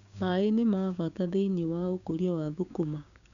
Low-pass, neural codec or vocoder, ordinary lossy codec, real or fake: 7.2 kHz; codec, 16 kHz, 6 kbps, DAC; none; fake